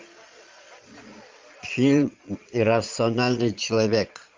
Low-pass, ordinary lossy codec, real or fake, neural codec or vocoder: 7.2 kHz; Opus, 24 kbps; fake; vocoder, 22.05 kHz, 80 mel bands, Vocos